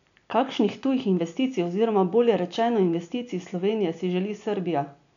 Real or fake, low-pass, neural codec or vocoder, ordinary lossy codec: real; 7.2 kHz; none; none